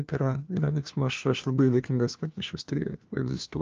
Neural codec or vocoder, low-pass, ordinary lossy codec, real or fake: codec, 16 kHz, 1 kbps, FunCodec, trained on LibriTTS, 50 frames a second; 7.2 kHz; Opus, 24 kbps; fake